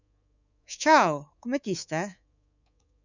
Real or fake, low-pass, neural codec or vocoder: fake; 7.2 kHz; codec, 24 kHz, 3.1 kbps, DualCodec